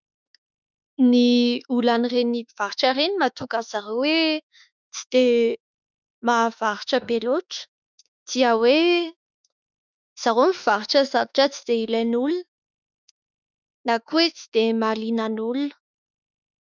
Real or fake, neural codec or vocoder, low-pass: fake; autoencoder, 48 kHz, 32 numbers a frame, DAC-VAE, trained on Japanese speech; 7.2 kHz